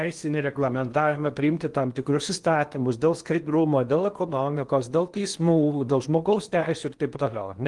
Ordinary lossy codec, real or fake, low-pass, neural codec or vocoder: Opus, 24 kbps; fake; 10.8 kHz; codec, 16 kHz in and 24 kHz out, 0.8 kbps, FocalCodec, streaming, 65536 codes